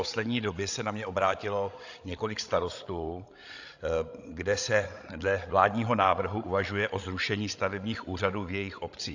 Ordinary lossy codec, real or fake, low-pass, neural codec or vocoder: AAC, 48 kbps; fake; 7.2 kHz; codec, 16 kHz, 16 kbps, FreqCodec, larger model